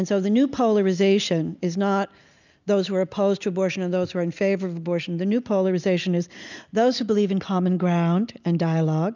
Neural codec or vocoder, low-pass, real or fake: none; 7.2 kHz; real